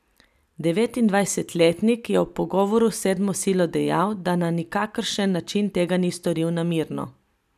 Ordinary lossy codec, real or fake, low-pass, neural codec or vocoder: none; real; 14.4 kHz; none